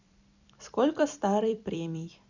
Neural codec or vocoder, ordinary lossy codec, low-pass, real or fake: vocoder, 44.1 kHz, 128 mel bands every 256 samples, BigVGAN v2; none; 7.2 kHz; fake